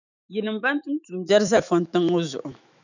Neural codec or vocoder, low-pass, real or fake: autoencoder, 48 kHz, 128 numbers a frame, DAC-VAE, trained on Japanese speech; 7.2 kHz; fake